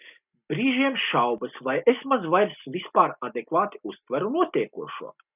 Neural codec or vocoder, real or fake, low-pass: none; real; 3.6 kHz